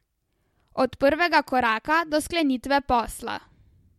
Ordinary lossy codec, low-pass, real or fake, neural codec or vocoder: MP3, 64 kbps; 19.8 kHz; real; none